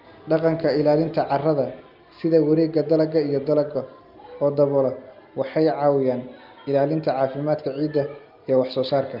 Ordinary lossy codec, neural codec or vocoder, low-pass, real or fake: Opus, 24 kbps; none; 5.4 kHz; real